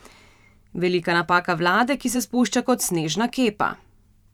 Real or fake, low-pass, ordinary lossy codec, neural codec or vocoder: fake; 19.8 kHz; none; vocoder, 44.1 kHz, 128 mel bands every 512 samples, BigVGAN v2